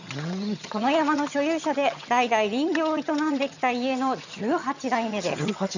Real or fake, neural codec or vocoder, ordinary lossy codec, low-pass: fake; vocoder, 22.05 kHz, 80 mel bands, HiFi-GAN; none; 7.2 kHz